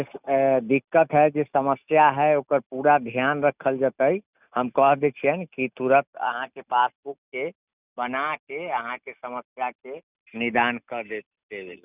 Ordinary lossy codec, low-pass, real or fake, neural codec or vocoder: none; 3.6 kHz; real; none